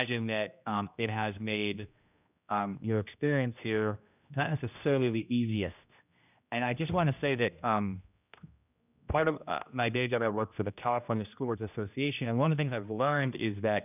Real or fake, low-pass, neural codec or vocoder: fake; 3.6 kHz; codec, 16 kHz, 1 kbps, X-Codec, HuBERT features, trained on general audio